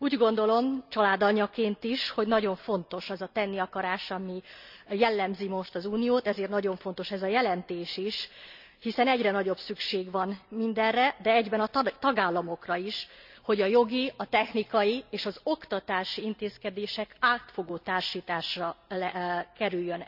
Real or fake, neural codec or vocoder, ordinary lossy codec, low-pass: real; none; none; 5.4 kHz